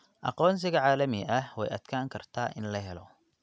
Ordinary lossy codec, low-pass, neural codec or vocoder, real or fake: none; none; none; real